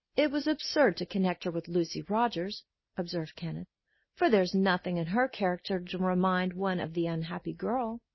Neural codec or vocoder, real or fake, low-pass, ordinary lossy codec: none; real; 7.2 kHz; MP3, 24 kbps